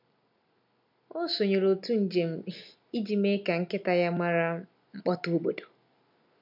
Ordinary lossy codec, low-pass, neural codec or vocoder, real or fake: none; 5.4 kHz; none; real